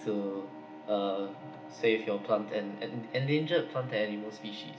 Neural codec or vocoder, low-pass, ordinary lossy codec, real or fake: none; none; none; real